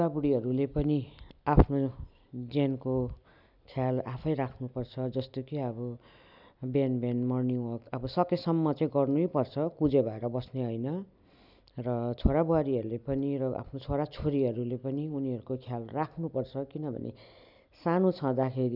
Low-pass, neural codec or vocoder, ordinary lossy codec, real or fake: 5.4 kHz; none; none; real